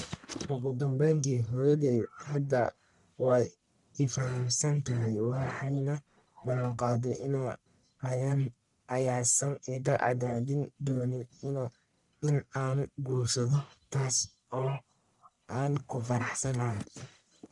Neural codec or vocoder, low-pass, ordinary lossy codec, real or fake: codec, 44.1 kHz, 1.7 kbps, Pupu-Codec; 10.8 kHz; none; fake